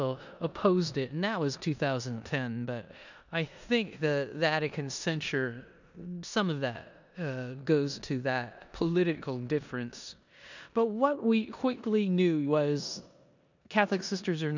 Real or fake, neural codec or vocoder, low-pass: fake; codec, 16 kHz in and 24 kHz out, 0.9 kbps, LongCat-Audio-Codec, four codebook decoder; 7.2 kHz